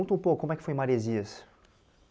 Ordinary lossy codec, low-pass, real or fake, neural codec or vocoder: none; none; real; none